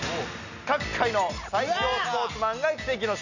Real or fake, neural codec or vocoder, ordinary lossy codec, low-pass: real; none; none; 7.2 kHz